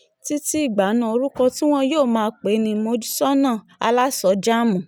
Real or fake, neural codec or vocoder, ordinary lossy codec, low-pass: real; none; none; none